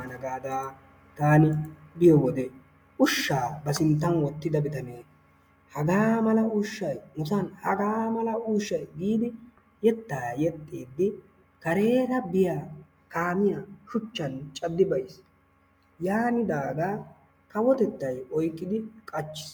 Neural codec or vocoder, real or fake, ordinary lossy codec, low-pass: none; real; MP3, 96 kbps; 19.8 kHz